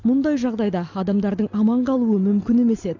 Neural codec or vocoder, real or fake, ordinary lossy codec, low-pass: none; real; AAC, 48 kbps; 7.2 kHz